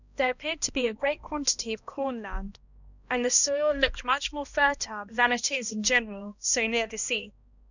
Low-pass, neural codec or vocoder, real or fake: 7.2 kHz; codec, 16 kHz, 1 kbps, X-Codec, HuBERT features, trained on balanced general audio; fake